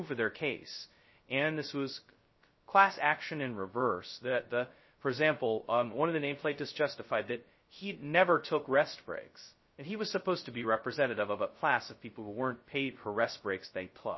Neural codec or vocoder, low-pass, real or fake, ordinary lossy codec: codec, 16 kHz, 0.2 kbps, FocalCodec; 7.2 kHz; fake; MP3, 24 kbps